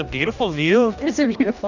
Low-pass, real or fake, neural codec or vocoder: 7.2 kHz; fake; codec, 16 kHz, 1 kbps, X-Codec, HuBERT features, trained on general audio